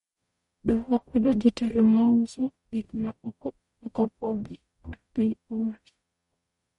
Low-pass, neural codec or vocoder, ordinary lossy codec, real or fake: 19.8 kHz; codec, 44.1 kHz, 0.9 kbps, DAC; MP3, 48 kbps; fake